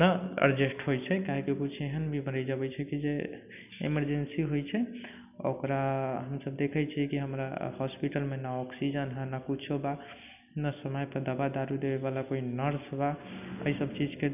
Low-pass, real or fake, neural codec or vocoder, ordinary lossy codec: 3.6 kHz; real; none; none